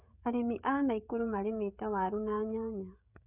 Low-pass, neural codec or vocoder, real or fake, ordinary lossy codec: 3.6 kHz; codec, 16 kHz, 16 kbps, FreqCodec, smaller model; fake; none